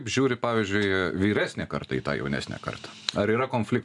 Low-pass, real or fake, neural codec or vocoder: 10.8 kHz; real; none